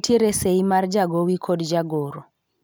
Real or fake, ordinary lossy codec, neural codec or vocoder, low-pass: real; none; none; none